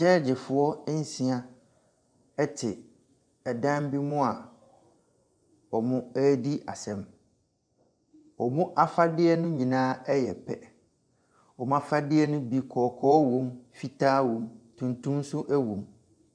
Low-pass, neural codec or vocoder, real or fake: 9.9 kHz; vocoder, 24 kHz, 100 mel bands, Vocos; fake